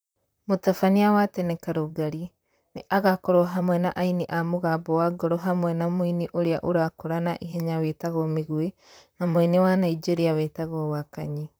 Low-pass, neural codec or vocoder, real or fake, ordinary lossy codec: none; vocoder, 44.1 kHz, 128 mel bands, Pupu-Vocoder; fake; none